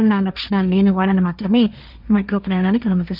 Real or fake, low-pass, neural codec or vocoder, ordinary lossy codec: fake; 5.4 kHz; codec, 16 kHz, 1.1 kbps, Voila-Tokenizer; none